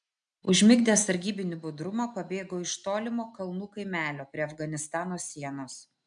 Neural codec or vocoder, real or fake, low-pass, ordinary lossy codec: none; real; 9.9 kHz; MP3, 96 kbps